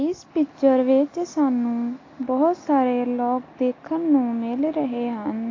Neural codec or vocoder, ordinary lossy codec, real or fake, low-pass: none; AAC, 32 kbps; real; 7.2 kHz